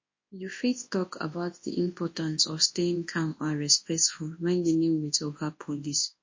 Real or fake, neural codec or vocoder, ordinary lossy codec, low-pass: fake; codec, 24 kHz, 0.9 kbps, WavTokenizer, large speech release; MP3, 32 kbps; 7.2 kHz